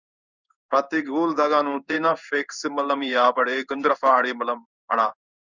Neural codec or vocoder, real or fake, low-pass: codec, 16 kHz in and 24 kHz out, 1 kbps, XY-Tokenizer; fake; 7.2 kHz